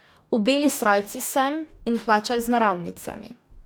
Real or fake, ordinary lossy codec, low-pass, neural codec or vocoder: fake; none; none; codec, 44.1 kHz, 2.6 kbps, DAC